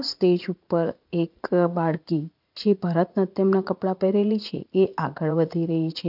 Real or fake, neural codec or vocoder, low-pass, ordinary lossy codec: fake; vocoder, 44.1 kHz, 80 mel bands, Vocos; 5.4 kHz; none